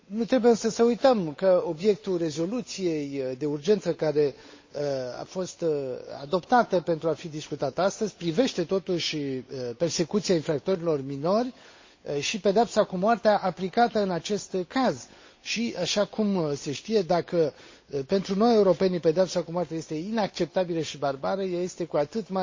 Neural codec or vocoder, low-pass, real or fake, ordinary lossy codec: codec, 16 kHz, 8 kbps, FunCodec, trained on Chinese and English, 25 frames a second; 7.2 kHz; fake; MP3, 32 kbps